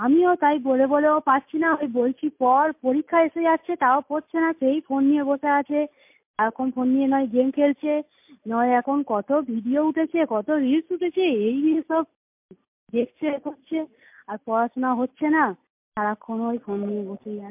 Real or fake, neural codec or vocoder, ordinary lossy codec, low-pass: real; none; MP3, 32 kbps; 3.6 kHz